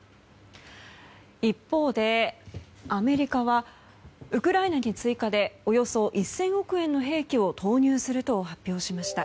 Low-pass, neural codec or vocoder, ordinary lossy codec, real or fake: none; none; none; real